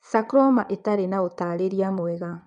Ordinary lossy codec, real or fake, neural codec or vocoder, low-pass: none; fake; vocoder, 22.05 kHz, 80 mel bands, WaveNeXt; 9.9 kHz